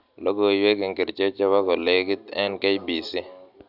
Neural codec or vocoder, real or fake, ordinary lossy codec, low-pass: none; real; none; 5.4 kHz